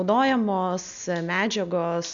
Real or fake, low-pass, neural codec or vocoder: real; 7.2 kHz; none